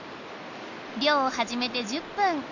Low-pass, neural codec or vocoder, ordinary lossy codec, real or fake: 7.2 kHz; none; none; real